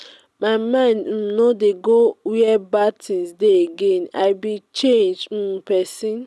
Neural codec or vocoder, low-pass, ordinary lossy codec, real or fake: none; none; none; real